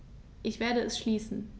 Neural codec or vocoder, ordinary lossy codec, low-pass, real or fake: none; none; none; real